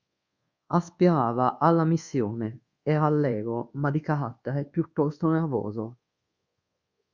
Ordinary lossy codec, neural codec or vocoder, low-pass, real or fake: Opus, 64 kbps; codec, 24 kHz, 1.2 kbps, DualCodec; 7.2 kHz; fake